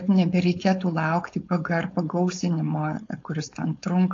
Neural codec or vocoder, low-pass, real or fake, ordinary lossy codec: codec, 16 kHz, 4.8 kbps, FACodec; 7.2 kHz; fake; AAC, 48 kbps